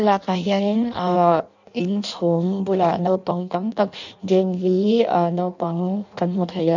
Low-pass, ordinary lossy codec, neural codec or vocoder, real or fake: 7.2 kHz; none; codec, 16 kHz in and 24 kHz out, 0.6 kbps, FireRedTTS-2 codec; fake